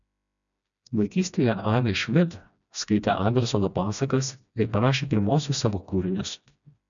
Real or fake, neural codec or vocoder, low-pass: fake; codec, 16 kHz, 1 kbps, FreqCodec, smaller model; 7.2 kHz